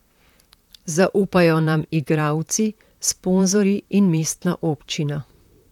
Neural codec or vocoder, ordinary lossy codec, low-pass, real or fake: vocoder, 44.1 kHz, 128 mel bands, Pupu-Vocoder; none; 19.8 kHz; fake